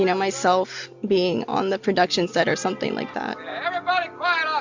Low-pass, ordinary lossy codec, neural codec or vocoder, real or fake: 7.2 kHz; MP3, 64 kbps; none; real